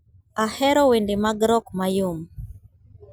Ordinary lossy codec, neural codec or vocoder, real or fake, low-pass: none; none; real; none